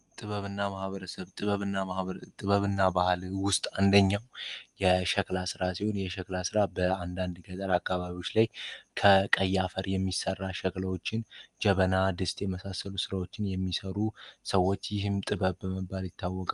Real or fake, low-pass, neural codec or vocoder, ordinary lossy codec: real; 10.8 kHz; none; Opus, 32 kbps